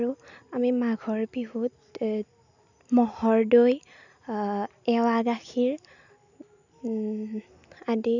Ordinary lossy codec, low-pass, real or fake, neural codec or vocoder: none; 7.2 kHz; real; none